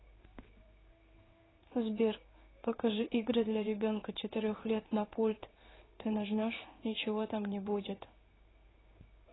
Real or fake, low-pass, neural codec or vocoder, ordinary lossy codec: fake; 7.2 kHz; codec, 16 kHz in and 24 kHz out, 1 kbps, XY-Tokenizer; AAC, 16 kbps